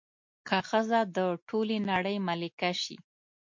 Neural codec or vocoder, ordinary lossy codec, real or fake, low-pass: none; MP3, 48 kbps; real; 7.2 kHz